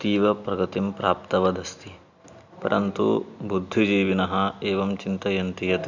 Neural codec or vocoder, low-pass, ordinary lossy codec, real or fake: none; 7.2 kHz; Opus, 64 kbps; real